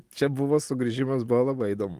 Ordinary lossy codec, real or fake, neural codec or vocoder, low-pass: Opus, 24 kbps; real; none; 14.4 kHz